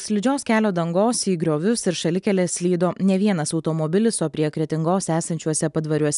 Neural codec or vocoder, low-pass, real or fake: none; 10.8 kHz; real